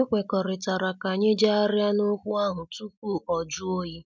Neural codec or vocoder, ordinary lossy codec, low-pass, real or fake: none; none; none; real